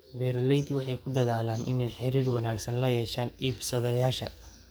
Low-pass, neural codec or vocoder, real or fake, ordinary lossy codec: none; codec, 44.1 kHz, 2.6 kbps, SNAC; fake; none